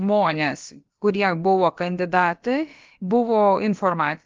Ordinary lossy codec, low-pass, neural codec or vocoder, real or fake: Opus, 32 kbps; 7.2 kHz; codec, 16 kHz, about 1 kbps, DyCAST, with the encoder's durations; fake